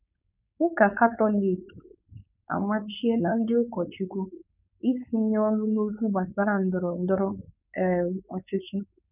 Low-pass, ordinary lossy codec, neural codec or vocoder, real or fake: 3.6 kHz; none; codec, 16 kHz, 4.8 kbps, FACodec; fake